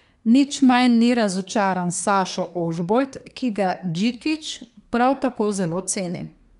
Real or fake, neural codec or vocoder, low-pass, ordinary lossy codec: fake; codec, 24 kHz, 1 kbps, SNAC; 10.8 kHz; none